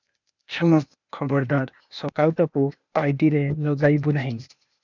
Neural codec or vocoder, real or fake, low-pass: codec, 16 kHz, 0.8 kbps, ZipCodec; fake; 7.2 kHz